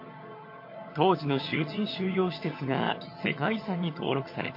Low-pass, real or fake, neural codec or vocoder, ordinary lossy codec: 5.4 kHz; fake; vocoder, 22.05 kHz, 80 mel bands, HiFi-GAN; MP3, 32 kbps